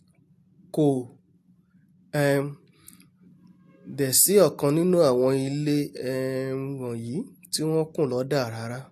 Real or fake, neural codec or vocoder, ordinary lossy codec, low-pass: real; none; AAC, 64 kbps; 14.4 kHz